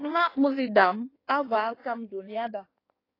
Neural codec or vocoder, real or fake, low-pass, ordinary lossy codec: codec, 16 kHz in and 24 kHz out, 1.1 kbps, FireRedTTS-2 codec; fake; 5.4 kHz; AAC, 24 kbps